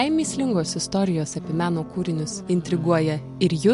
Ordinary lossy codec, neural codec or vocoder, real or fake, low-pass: MP3, 64 kbps; none; real; 10.8 kHz